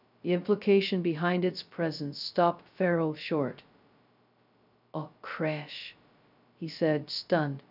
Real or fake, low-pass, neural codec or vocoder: fake; 5.4 kHz; codec, 16 kHz, 0.2 kbps, FocalCodec